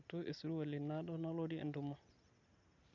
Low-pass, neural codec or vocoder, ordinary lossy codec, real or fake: 7.2 kHz; none; none; real